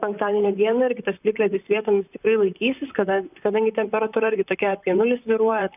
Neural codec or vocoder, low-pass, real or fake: vocoder, 44.1 kHz, 128 mel bands, Pupu-Vocoder; 3.6 kHz; fake